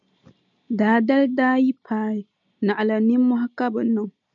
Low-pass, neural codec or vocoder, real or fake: 7.2 kHz; none; real